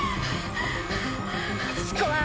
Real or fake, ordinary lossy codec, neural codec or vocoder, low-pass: real; none; none; none